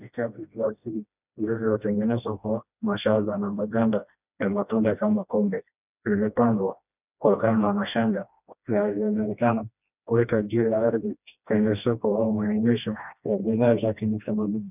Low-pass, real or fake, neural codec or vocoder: 3.6 kHz; fake; codec, 16 kHz, 1 kbps, FreqCodec, smaller model